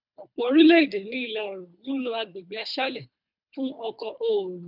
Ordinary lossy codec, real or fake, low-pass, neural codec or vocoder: none; fake; 5.4 kHz; codec, 24 kHz, 3 kbps, HILCodec